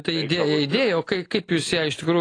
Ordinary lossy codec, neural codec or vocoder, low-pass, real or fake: AAC, 32 kbps; none; 9.9 kHz; real